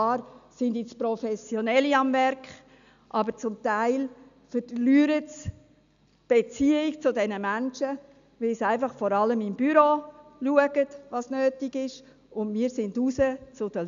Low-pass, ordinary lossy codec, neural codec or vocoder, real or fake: 7.2 kHz; none; none; real